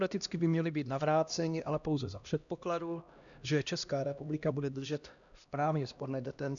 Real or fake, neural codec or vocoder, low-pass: fake; codec, 16 kHz, 1 kbps, X-Codec, HuBERT features, trained on LibriSpeech; 7.2 kHz